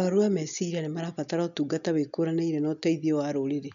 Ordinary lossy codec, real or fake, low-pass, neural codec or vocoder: MP3, 96 kbps; real; 7.2 kHz; none